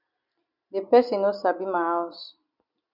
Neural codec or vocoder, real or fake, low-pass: none; real; 5.4 kHz